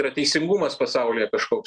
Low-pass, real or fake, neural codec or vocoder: 9.9 kHz; real; none